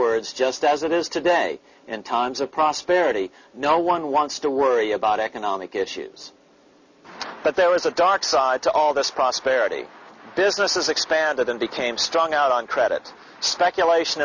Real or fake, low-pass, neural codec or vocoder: real; 7.2 kHz; none